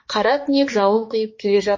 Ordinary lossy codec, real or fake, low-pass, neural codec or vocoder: MP3, 32 kbps; fake; 7.2 kHz; codec, 16 kHz, 2 kbps, X-Codec, HuBERT features, trained on balanced general audio